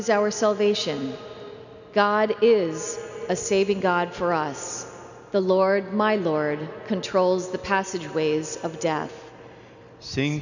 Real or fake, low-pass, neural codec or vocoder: real; 7.2 kHz; none